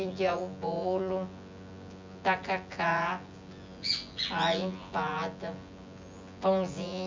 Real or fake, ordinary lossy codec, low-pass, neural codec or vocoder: fake; MP3, 64 kbps; 7.2 kHz; vocoder, 24 kHz, 100 mel bands, Vocos